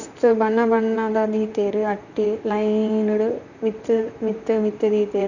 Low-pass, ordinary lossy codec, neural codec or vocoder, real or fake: 7.2 kHz; none; vocoder, 44.1 kHz, 128 mel bands, Pupu-Vocoder; fake